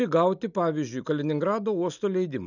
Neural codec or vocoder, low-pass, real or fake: none; 7.2 kHz; real